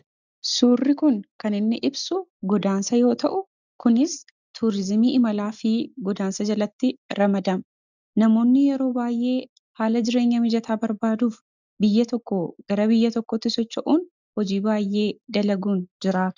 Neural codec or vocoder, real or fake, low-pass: none; real; 7.2 kHz